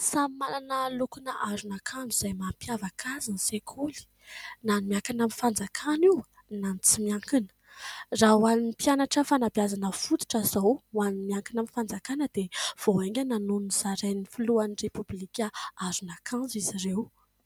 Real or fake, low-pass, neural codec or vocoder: real; 19.8 kHz; none